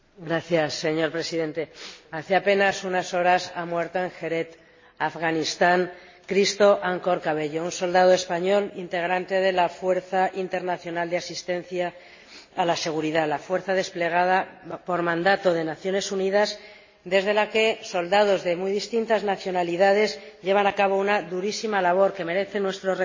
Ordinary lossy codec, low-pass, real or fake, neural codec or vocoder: MP3, 32 kbps; 7.2 kHz; real; none